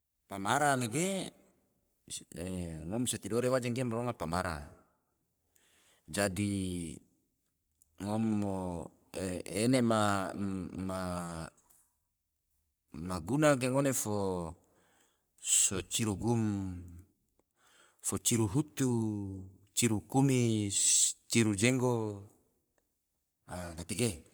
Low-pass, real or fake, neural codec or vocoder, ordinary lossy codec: none; fake; codec, 44.1 kHz, 3.4 kbps, Pupu-Codec; none